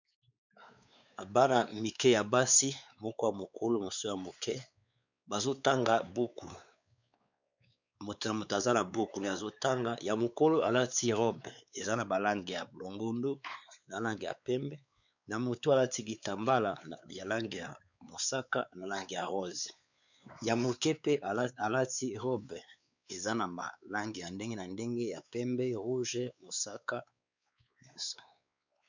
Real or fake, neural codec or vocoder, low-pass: fake; codec, 16 kHz, 4 kbps, X-Codec, WavLM features, trained on Multilingual LibriSpeech; 7.2 kHz